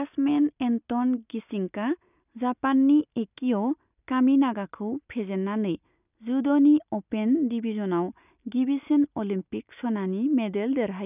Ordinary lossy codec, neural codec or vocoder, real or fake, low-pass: none; none; real; 3.6 kHz